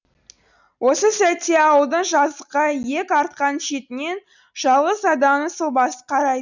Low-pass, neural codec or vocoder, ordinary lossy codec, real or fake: 7.2 kHz; none; none; real